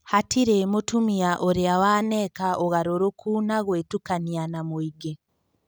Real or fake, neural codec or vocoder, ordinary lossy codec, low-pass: real; none; none; none